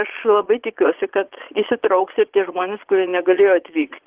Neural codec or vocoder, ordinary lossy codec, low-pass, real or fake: vocoder, 22.05 kHz, 80 mel bands, Vocos; Opus, 16 kbps; 3.6 kHz; fake